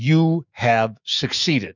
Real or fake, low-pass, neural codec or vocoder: fake; 7.2 kHz; autoencoder, 48 kHz, 128 numbers a frame, DAC-VAE, trained on Japanese speech